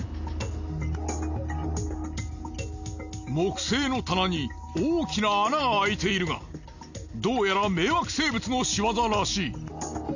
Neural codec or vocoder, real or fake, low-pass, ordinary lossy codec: none; real; 7.2 kHz; none